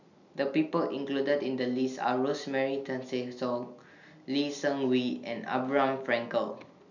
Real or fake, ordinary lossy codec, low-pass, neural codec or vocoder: real; none; 7.2 kHz; none